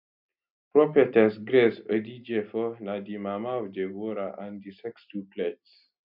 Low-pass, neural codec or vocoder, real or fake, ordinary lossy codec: 5.4 kHz; none; real; none